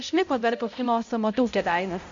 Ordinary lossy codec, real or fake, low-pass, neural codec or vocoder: MP3, 48 kbps; fake; 7.2 kHz; codec, 16 kHz, 0.5 kbps, X-Codec, HuBERT features, trained on balanced general audio